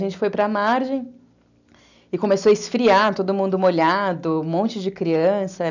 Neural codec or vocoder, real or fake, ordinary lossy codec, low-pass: none; real; none; 7.2 kHz